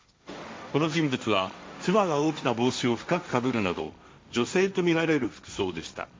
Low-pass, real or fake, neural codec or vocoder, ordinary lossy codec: none; fake; codec, 16 kHz, 1.1 kbps, Voila-Tokenizer; none